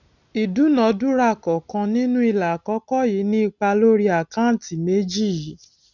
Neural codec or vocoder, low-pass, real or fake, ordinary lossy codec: none; 7.2 kHz; real; none